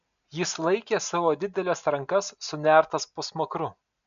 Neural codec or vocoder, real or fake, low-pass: none; real; 7.2 kHz